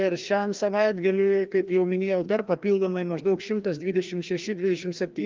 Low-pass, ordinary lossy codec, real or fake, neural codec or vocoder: 7.2 kHz; Opus, 32 kbps; fake; codec, 16 kHz, 1 kbps, FreqCodec, larger model